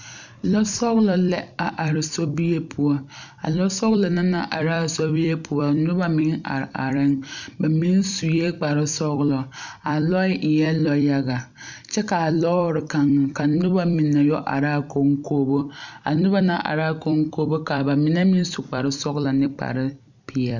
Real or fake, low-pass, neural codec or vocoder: fake; 7.2 kHz; vocoder, 44.1 kHz, 128 mel bands every 256 samples, BigVGAN v2